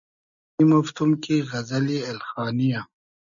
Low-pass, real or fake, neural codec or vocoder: 7.2 kHz; real; none